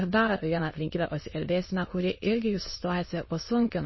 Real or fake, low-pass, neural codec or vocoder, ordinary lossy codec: fake; 7.2 kHz; autoencoder, 22.05 kHz, a latent of 192 numbers a frame, VITS, trained on many speakers; MP3, 24 kbps